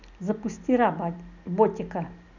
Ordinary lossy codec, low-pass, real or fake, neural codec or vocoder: none; 7.2 kHz; real; none